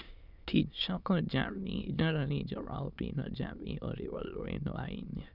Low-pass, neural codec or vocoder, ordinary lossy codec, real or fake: 5.4 kHz; autoencoder, 22.05 kHz, a latent of 192 numbers a frame, VITS, trained on many speakers; none; fake